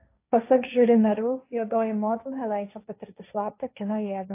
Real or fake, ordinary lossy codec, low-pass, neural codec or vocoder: fake; AAC, 24 kbps; 3.6 kHz; codec, 16 kHz, 1.1 kbps, Voila-Tokenizer